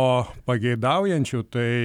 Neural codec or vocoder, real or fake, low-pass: none; real; 19.8 kHz